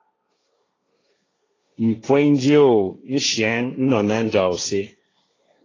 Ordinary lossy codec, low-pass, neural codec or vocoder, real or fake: AAC, 32 kbps; 7.2 kHz; codec, 16 kHz, 1.1 kbps, Voila-Tokenizer; fake